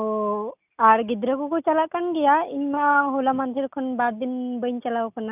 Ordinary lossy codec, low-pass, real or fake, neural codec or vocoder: none; 3.6 kHz; real; none